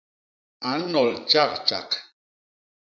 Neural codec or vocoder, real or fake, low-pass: vocoder, 44.1 kHz, 80 mel bands, Vocos; fake; 7.2 kHz